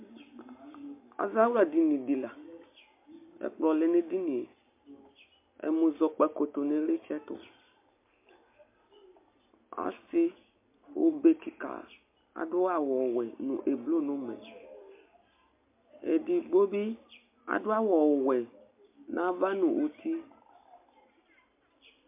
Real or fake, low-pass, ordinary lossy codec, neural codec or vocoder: real; 3.6 kHz; MP3, 32 kbps; none